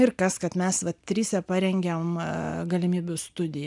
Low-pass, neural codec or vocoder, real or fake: 10.8 kHz; none; real